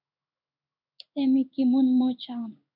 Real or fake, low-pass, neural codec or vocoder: fake; 5.4 kHz; codec, 16 kHz in and 24 kHz out, 1 kbps, XY-Tokenizer